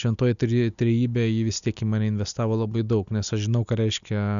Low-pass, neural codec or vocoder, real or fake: 7.2 kHz; none; real